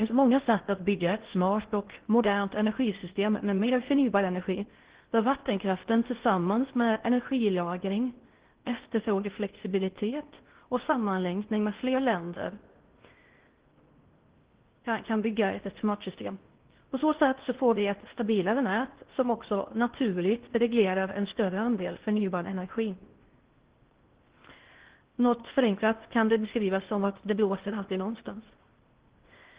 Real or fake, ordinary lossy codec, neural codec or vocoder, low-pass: fake; Opus, 16 kbps; codec, 16 kHz in and 24 kHz out, 0.6 kbps, FocalCodec, streaming, 4096 codes; 3.6 kHz